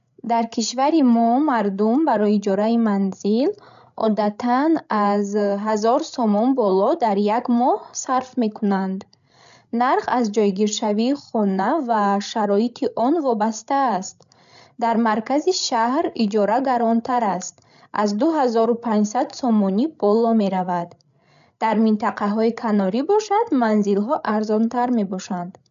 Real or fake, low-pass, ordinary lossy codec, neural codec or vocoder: fake; 7.2 kHz; AAC, 96 kbps; codec, 16 kHz, 16 kbps, FreqCodec, larger model